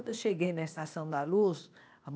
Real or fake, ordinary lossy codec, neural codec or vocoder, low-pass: fake; none; codec, 16 kHz, 0.8 kbps, ZipCodec; none